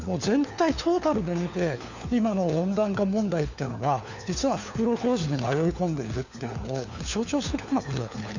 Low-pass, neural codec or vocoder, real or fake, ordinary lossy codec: 7.2 kHz; codec, 16 kHz, 4 kbps, FunCodec, trained on LibriTTS, 50 frames a second; fake; none